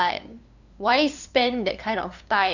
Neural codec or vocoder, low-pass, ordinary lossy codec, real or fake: codec, 16 kHz, 2 kbps, FunCodec, trained on LibriTTS, 25 frames a second; 7.2 kHz; none; fake